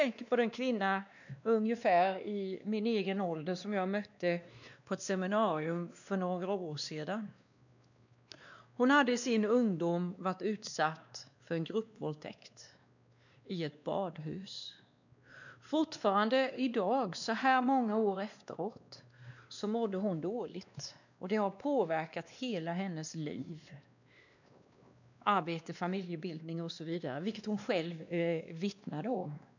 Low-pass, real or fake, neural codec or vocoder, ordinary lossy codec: 7.2 kHz; fake; codec, 16 kHz, 2 kbps, X-Codec, WavLM features, trained on Multilingual LibriSpeech; none